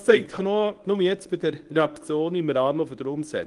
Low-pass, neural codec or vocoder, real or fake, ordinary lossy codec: 10.8 kHz; codec, 24 kHz, 0.9 kbps, WavTokenizer, medium speech release version 1; fake; Opus, 24 kbps